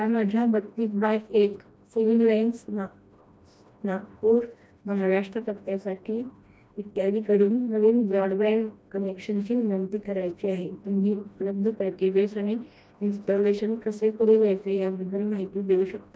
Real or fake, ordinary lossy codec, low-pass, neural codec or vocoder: fake; none; none; codec, 16 kHz, 1 kbps, FreqCodec, smaller model